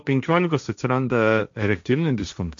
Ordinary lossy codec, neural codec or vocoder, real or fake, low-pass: AAC, 64 kbps; codec, 16 kHz, 1.1 kbps, Voila-Tokenizer; fake; 7.2 kHz